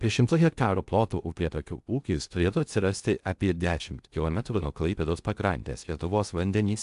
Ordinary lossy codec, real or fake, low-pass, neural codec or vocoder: AAC, 96 kbps; fake; 10.8 kHz; codec, 16 kHz in and 24 kHz out, 0.6 kbps, FocalCodec, streaming, 2048 codes